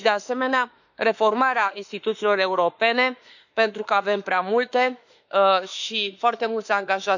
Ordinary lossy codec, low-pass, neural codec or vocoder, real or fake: none; 7.2 kHz; autoencoder, 48 kHz, 32 numbers a frame, DAC-VAE, trained on Japanese speech; fake